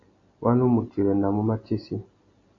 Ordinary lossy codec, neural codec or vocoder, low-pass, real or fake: AAC, 32 kbps; none; 7.2 kHz; real